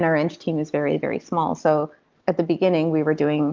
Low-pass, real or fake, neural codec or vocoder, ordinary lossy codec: 7.2 kHz; real; none; Opus, 24 kbps